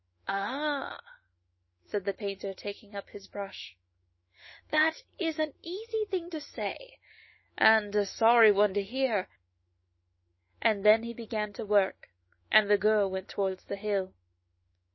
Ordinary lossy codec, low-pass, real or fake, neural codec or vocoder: MP3, 24 kbps; 7.2 kHz; fake; autoencoder, 48 kHz, 128 numbers a frame, DAC-VAE, trained on Japanese speech